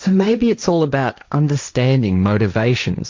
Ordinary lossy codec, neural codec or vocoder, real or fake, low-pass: MP3, 64 kbps; codec, 16 kHz, 1.1 kbps, Voila-Tokenizer; fake; 7.2 kHz